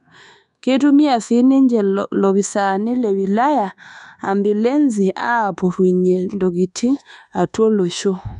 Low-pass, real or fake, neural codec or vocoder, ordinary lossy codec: 10.8 kHz; fake; codec, 24 kHz, 1.2 kbps, DualCodec; none